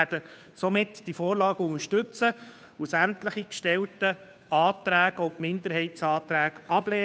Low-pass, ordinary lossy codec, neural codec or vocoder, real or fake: none; none; codec, 16 kHz, 2 kbps, FunCodec, trained on Chinese and English, 25 frames a second; fake